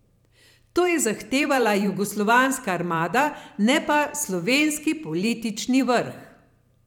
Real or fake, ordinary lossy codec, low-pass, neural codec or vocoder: fake; none; 19.8 kHz; vocoder, 44.1 kHz, 128 mel bands every 512 samples, BigVGAN v2